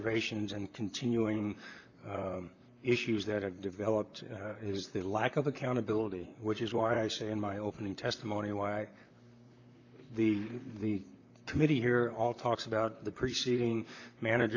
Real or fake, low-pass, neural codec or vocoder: fake; 7.2 kHz; vocoder, 44.1 kHz, 128 mel bands, Pupu-Vocoder